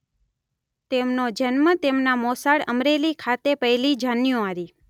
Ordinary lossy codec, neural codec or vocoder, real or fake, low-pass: none; none; real; 19.8 kHz